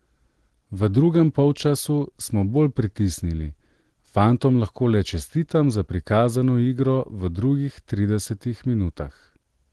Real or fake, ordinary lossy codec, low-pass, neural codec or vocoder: real; Opus, 16 kbps; 10.8 kHz; none